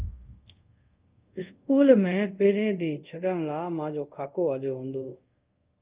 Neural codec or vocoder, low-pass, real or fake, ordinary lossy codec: codec, 24 kHz, 0.5 kbps, DualCodec; 3.6 kHz; fake; Opus, 32 kbps